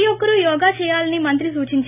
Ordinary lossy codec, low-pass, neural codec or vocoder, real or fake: none; 3.6 kHz; none; real